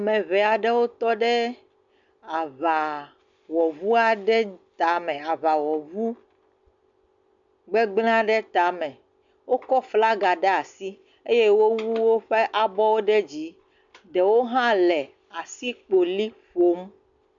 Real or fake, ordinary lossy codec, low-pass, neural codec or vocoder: real; MP3, 64 kbps; 7.2 kHz; none